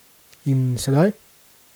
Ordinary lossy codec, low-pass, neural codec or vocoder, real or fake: none; none; codec, 44.1 kHz, 7.8 kbps, Pupu-Codec; fake